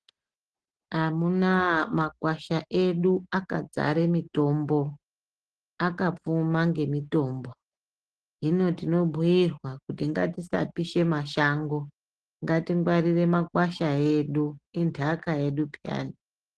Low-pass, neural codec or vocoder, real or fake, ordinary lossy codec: 10.8 kHz; none; real; Opus, 16 kbps